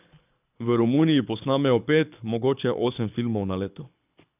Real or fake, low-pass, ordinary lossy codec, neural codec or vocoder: fake; 3.6 kHz; none; codec, 24 kHz, 6 kbps, HILCodec